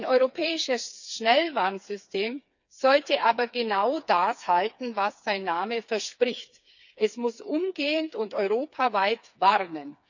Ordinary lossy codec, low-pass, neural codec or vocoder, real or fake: none; 7.2 kHz; codec, 16 kHz, 4 kbps, FreqCodec, smaller model; fake